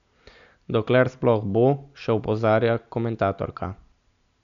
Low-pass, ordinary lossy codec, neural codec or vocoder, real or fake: 7.2 kHz; none; none; real